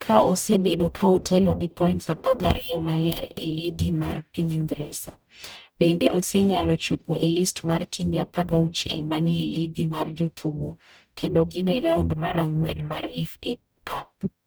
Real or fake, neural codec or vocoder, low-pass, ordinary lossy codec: fake; codec, 44.1 kHz, 0.9 kbps, DAC; none; none